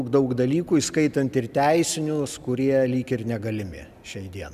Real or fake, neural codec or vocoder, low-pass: real; none; 14.4 kHz